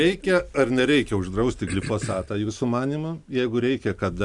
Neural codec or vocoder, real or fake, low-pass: none; real; 10.8 kHz